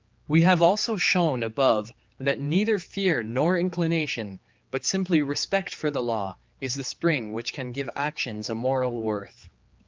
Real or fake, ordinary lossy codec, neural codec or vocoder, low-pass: fake; Opus, 24 kbps; codec, 16 kHz, 4 kbps, X-Codec, HuBERT features, trained on general audio; 7.2 kHz